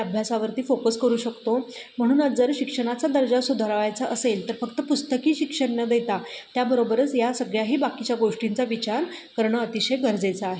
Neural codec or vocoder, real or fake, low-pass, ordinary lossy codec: none; real; none; none